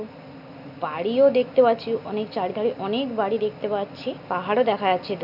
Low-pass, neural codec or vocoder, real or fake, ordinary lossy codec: 5.4 kHz; none; real; none